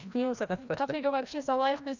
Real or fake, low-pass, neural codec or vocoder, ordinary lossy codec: fake; 7.2 kHz; codec, 16 kHz, 1 kbps, FreqCodec, larger model; none